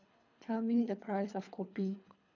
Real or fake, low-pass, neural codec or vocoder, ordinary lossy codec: fake; 7.2 kHz; codec, 24 kHz, 3 kbps, HILCodec; none